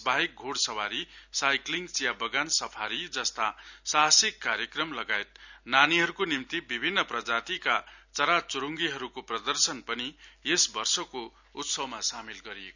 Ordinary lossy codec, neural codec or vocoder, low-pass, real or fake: none; none; 7.2 kHz; real